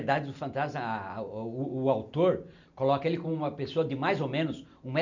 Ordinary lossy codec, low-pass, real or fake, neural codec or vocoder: none; 7.2 kHz; real; none